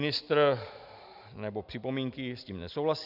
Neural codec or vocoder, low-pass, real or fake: none; 5.4 kHz; real